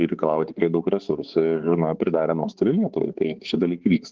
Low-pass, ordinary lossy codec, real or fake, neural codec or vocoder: 7.2 kHz; Opus, 16 kbps; fake; codec, 16 kHz, 4 kbps, X-Codec, HuBERT features, trained on balanced general audio